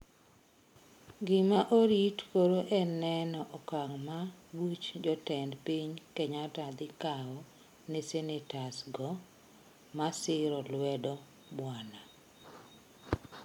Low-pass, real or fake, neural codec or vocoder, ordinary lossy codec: 19.8 kHz; real; none; MP3, 96 kbps